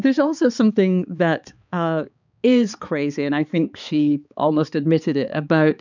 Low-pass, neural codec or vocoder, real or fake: 7.2 kHz; codec, 16 kHz, 4 kbps, X-Codec, HuBERT features, trained on balanced general audio; fake